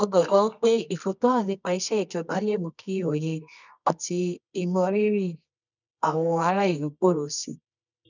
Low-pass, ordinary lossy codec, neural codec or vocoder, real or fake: 7.2 kHz; none; codec, 24 kHz, 0.9 kbps, WavTokenizer, medium music audio release; fake